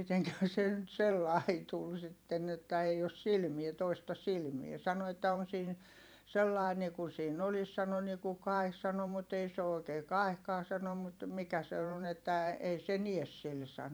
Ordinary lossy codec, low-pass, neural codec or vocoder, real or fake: none; none; vocoder, 44.1 kHz, 128 mel bands every 512 samples, BigVGAN v2; fake